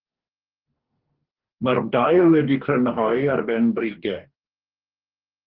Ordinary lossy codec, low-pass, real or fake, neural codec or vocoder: Opus, 16 kbps; 5.4 kHz; fake; codec, 44.1 kHz, 2.6 kbps, DAC